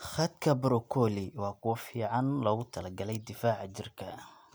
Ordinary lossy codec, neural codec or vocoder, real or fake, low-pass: none; none; real; none